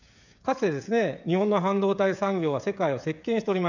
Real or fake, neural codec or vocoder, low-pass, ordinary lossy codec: fake; codec, 16 kHz, 16 kbps, FreqCodec, smaller model; 7.2 kHz; none